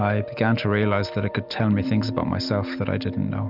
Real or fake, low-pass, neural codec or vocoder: real; 5.4 kHz; none